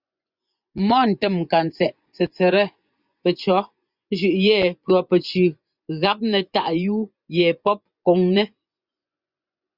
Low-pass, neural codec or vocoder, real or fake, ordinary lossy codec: 5.4 kHz; vocoder, 24 kHz, 100 mel bands, Vocos; fake; Opus, 64 kbps